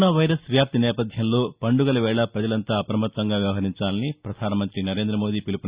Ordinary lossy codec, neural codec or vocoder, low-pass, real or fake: Opus, 64 kbps; none; 3.6 kHz; real